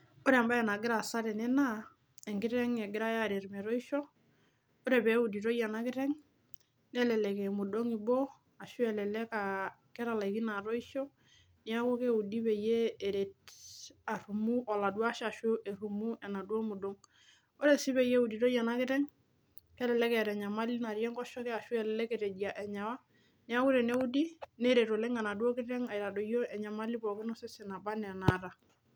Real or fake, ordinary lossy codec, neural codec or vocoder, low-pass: real; none; none; none